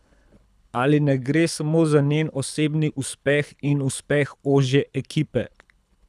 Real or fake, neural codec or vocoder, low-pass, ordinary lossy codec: fake; codec, 24 kHz, 6 kbps, HILCodec; none; none